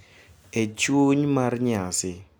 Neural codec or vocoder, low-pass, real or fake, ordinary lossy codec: none; none; real; none